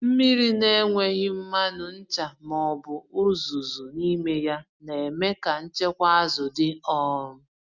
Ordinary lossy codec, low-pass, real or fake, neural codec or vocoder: Opus, 64 kbps; 7.2 kHz; real; none